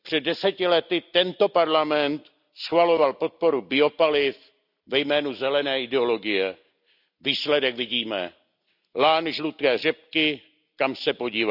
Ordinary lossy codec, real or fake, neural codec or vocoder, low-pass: none; real; none; 5.4 kHz